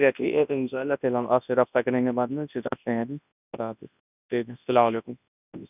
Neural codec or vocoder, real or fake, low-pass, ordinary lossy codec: codec, 24 kHz, 0.9 kbps, WavTokenizer, large speech release; fake; 3.6 kHz; none